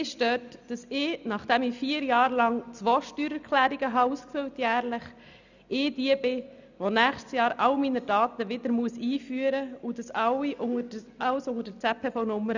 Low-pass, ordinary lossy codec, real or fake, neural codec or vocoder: 7.2 kHz; none; real; none